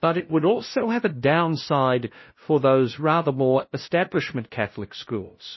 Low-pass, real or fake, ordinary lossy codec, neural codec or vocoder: 7.2 kHz; fake; MP3, 24 kbps; codec, 16 kHz, 0.5 kbps, FunCodec, trained on LibriTTS, 25 frames a second